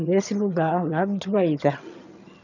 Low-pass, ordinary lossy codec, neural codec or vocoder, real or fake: 7.2 kHz; none; vocoder, 22.05 kHz, 80 mel bands, HiFi-GAN; fake